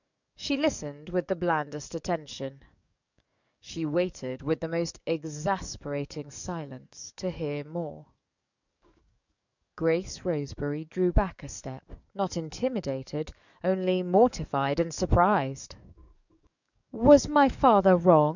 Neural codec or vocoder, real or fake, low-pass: codec, 44.1 kHz, 7.8 kbps, DAC; fake; 7.2 kHz